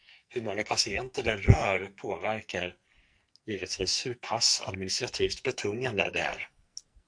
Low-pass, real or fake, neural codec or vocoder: 9.9 kHz; fake; codec, 44.1 kHz, 2.6 kbps, SNAC